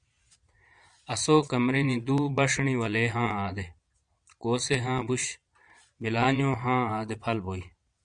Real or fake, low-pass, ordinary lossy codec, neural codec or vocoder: fake; 9.9 kHz; MP3, 96 kbps; vocoder, 22.05 kHz, 80 mel bands, Vocos